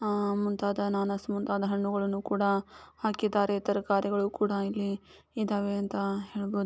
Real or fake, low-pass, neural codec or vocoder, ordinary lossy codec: real; none; none; none